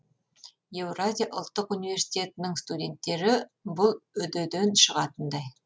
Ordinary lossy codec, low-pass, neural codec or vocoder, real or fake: none; none; none; real